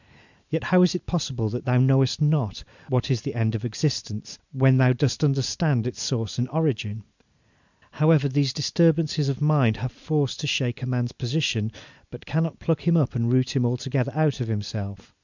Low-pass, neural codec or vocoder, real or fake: 7.2 kHz; none; real